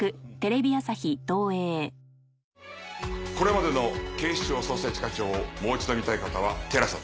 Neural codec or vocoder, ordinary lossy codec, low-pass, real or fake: none; none; none; real